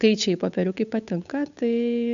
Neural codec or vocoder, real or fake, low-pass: codec, 16 kHz, 8 kbps, FunCodec, trained on Chinese and English, 25 frames a second; fake; 7.2 kHz